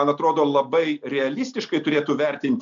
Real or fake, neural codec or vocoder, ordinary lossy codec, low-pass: real; none; MP3, 64 kbps; 7.2 kHz